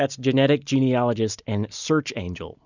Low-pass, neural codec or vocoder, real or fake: 7.2 kHz; none; real